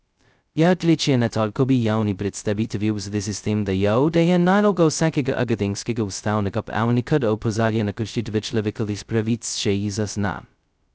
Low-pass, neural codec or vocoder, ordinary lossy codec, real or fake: none; codec, 16 kHz, 0.2 kbps, FocalCodec; none; fake